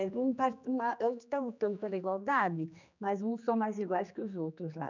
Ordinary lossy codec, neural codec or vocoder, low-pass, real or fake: none; codec, 16 kHz, 2 kbps, X-Codec, HuBERT features, trained on general audio; 7.2 kHz; fake